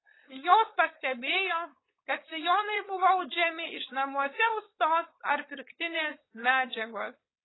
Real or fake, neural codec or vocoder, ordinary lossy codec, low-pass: fake; codec, 16 kHz, 4.8 kbps, FACodec; AAC, 16 kbps; 7.2 kHz